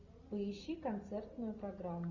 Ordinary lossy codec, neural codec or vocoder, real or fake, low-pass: Opus, 64 kbps; none; real; 7.2 kHz